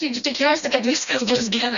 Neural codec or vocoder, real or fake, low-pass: codec, 16 kHz, 1 kbps, FreqCodec, smaller model; fake; 7.2 kHz